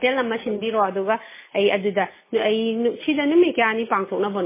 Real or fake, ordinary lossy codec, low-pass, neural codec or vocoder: real; MP3, 16 kbps; 3.6 kHz; none